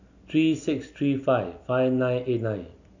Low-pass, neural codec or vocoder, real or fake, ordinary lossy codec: 7.2 kHz; none; real; none